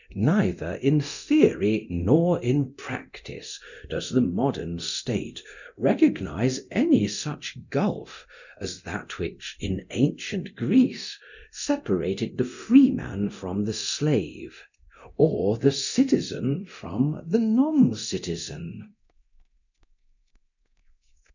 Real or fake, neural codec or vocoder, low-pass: fake; codec, 24 kHz, 0.9 kbps, DualCodec; 7.2 kHz